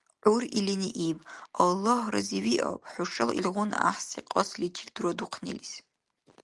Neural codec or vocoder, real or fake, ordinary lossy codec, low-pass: none; real; Opus, 24 kbps; 10.8 kHz